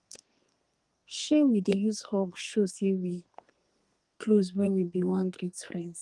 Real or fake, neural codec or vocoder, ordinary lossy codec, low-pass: fake; codec, 32 kHz, 1.9 kbps, SNAC; Opus, 24 kbps; 10.8 kHz